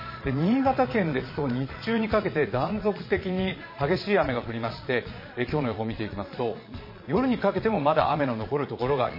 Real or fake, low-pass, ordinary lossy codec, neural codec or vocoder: fake; 5.4 kHz; MP3, 24 kbps; vocoder, 22.05 kHz, 80 mel bands, Vocos